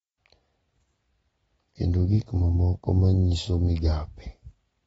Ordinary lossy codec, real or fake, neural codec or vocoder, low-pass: AAC, 24 kbps; real; none; 10.8 kHz